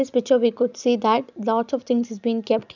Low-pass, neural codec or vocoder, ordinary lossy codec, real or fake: 7.2 kHz; none; none; real